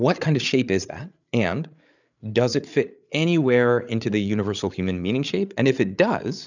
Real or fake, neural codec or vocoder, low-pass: fake; codec, 16 kHz, 8 kbps, FreqCodec, larger model; 7.2 kHz